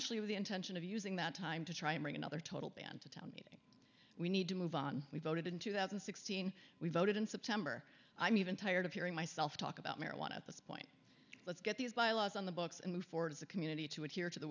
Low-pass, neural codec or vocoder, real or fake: 7.2 kHz; none; real